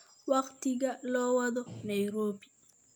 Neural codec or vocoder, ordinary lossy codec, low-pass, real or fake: none; none; none; real